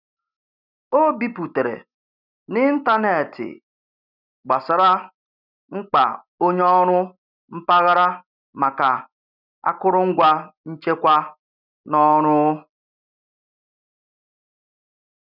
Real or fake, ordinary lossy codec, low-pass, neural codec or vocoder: real; none; 5.4 kHz; none